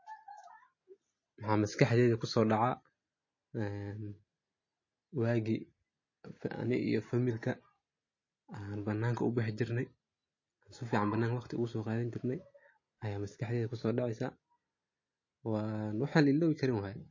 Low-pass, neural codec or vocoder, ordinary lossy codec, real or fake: 7.2 kHz; none; MP3, 32 kbps; real